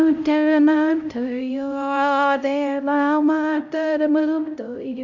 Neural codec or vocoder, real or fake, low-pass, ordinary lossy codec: codec, 16 kHz, 0.5 kbps, X-Codec, HuBERT features, trained on LibriSpeech; fake; 7.2 kHz; none